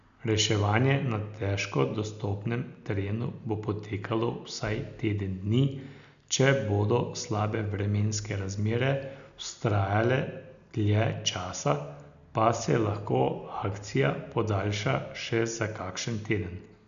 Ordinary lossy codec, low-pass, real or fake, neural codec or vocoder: none; 7.2 kHz; real; none